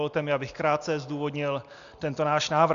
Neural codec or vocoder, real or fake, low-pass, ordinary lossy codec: none; real; 7.2 kHz; Opus, 64 kbps